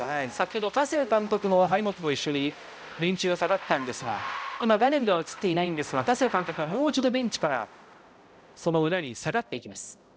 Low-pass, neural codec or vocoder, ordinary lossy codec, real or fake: none; codec, 16 kHz, 0.5 kbps, X-Codec, HuBERT features, trained on balanced general audio; none; fake